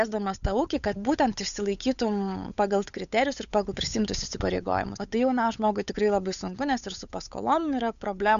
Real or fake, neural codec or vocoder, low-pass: fake; codec, 16 kHz, 8 kbps, FunCodec, trained on Chinese and English, 25 frames a second; 7.2 kHz